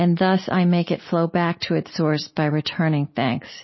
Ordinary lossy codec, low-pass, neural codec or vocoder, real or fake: MP3, 24 kbps; 7.2 kHz; none; real